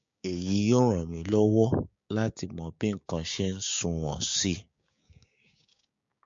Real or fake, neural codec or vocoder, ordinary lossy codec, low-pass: fake; codec, 16 kHz, 6 kbps, DAC; MP3, 48 kbps; 7.2 kHz